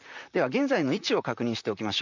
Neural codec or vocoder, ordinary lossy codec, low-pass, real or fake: vocoder, 44.1 kHz, 128 mel bands, Pupu-Vocoder; none; 7.2 kHz; fake